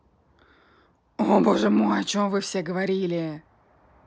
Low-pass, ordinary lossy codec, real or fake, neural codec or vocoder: none; none; real; none